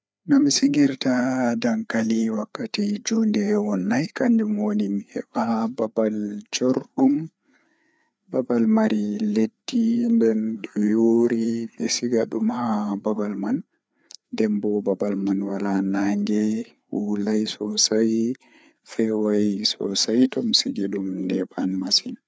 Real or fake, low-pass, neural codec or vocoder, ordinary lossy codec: fake; none; codec, 16 kHz, 4 kbps, FreqCodec, larger model; none